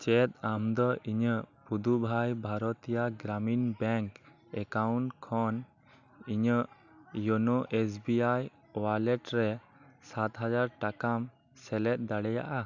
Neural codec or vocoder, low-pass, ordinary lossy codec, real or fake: none; 7.2 kHz; none; real